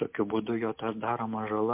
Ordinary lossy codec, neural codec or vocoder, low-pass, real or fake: MP3, 32 kbps; none; 3.6 kHz; real